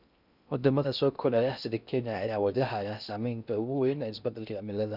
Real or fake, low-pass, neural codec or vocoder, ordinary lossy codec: fake; 5.4 kHz; codec, 16 kHz in and 24 kHz out, 0.6 kbps, FocalCodec, streaming, 2048 codes; none